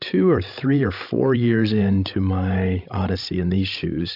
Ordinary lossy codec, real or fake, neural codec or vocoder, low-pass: AAC, 48 kbps; fake; codec, 16 kHz, 16 kbps, FreqCodec, larger model; 5.4 kHz